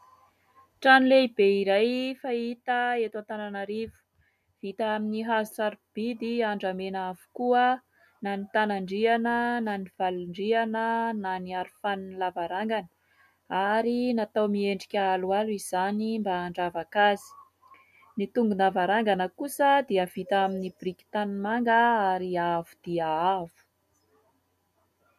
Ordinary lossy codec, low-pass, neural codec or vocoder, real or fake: MP3, 96 kbps; 14.4 kHz; none; real